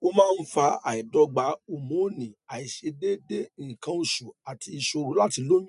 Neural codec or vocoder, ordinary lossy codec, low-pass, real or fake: none; none; 10.8 kHz; real